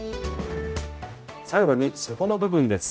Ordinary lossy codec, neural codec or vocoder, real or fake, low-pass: none; codec, 16 kHz, 0.5 kbps, X-Codec, HuBERT features, trained on general audio; fake; none